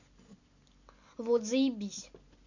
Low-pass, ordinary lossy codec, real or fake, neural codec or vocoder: 7.2 kHz; none; real; none